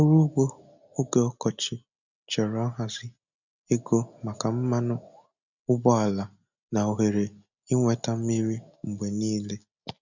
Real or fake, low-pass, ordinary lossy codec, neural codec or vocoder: real; 7.2 kHz; none; none